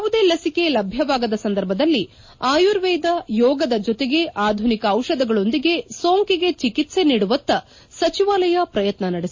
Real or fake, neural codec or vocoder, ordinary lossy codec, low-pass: real; none; MP3, 32 kbps; 7.2 kHz